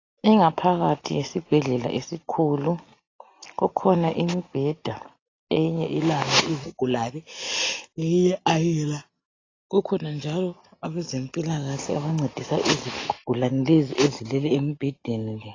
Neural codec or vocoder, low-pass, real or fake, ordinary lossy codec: none; 7.2 kHz; real; AAC, 32 kbps